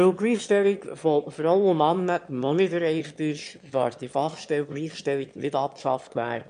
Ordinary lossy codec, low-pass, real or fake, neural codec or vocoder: MP3, 64 kbps; 9.9 kHz; fake; autoencoder, 22.05 kHz, a latent of 192 numbers a frame, VITS, trained on one speaker